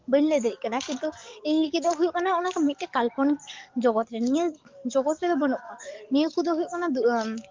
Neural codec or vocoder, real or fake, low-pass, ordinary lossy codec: codec, 44.1 kHz, 7.8 kbps, DAC; fake; 7.2 kHz; Opus, 24 kbps